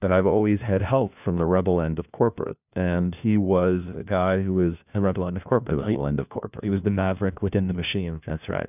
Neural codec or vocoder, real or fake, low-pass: codec, 16 kHz, 1 kbps, FunCodec, trained on LibriTTS, 50 frames a second; fake; 3.6 kHz